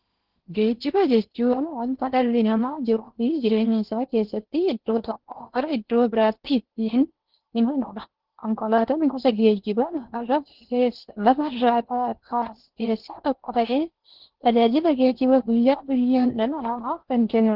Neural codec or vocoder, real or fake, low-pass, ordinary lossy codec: codec, 16 kHz in and 24 kHz out, 0.8 kbps, FocalCodec, streaming, 65536 codes; fake; 5.4 kHz; Opus, 16 kbps